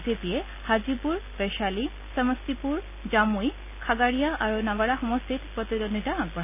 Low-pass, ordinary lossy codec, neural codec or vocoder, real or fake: 3.6 kHz; none; none; real